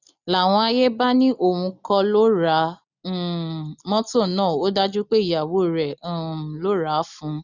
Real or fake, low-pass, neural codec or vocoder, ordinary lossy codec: real; 7.2 kHz; none; none